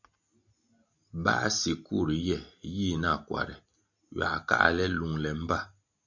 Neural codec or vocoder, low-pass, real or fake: none; 7.2 kHz; real